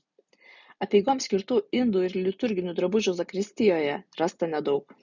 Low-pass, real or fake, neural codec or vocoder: 7.2 kHz; real; none